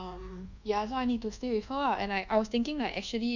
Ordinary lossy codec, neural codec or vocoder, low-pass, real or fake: none; codec, 24 kHz, 1.2 kbps, DualCodec; 7.2 kHz; fake